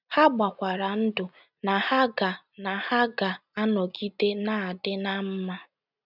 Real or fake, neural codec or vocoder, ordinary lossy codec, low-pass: real; none; none; 5.4 kHz